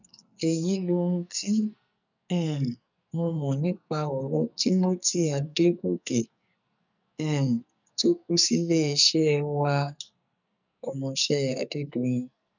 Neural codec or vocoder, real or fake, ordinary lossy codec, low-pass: codec, 44.1 kHz, 2.6 kbps, SNAC; fake; none; 7.2 kHz